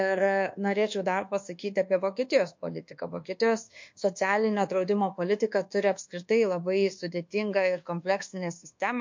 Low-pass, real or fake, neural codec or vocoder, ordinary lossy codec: 7.2 kHz; fake; codec, 24 kHz, 1.2 kbps, DualCodec; MP3, 48 kbps